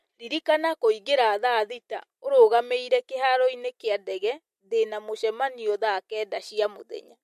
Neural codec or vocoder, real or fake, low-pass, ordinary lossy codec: none; real; 14.4 kHz; MP3, 64 kbps